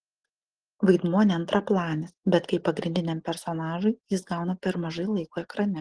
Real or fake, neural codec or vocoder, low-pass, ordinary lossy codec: real; none; 9.9 kHz; Opus, 16 kbps